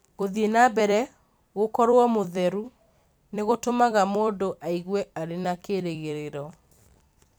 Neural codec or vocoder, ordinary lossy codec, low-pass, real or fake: vocoder, 44.1 kHz, 128 mel bands every 256 samples, BigVGAN v2; none; none; fake